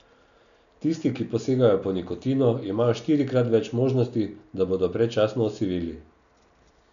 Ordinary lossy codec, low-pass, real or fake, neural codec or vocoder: none; 7.2 kHz; real; none